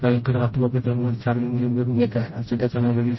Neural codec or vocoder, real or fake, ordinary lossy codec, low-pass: codec, 16 kHz, 0.5 kbps, FreqCodec, smaller model; fake; MP3, 24 kbps; 7.2 kHz